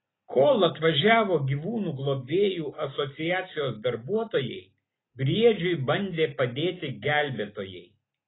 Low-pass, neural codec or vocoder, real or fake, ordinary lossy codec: 7.2 kHz; none; real; AAC, 16 kbps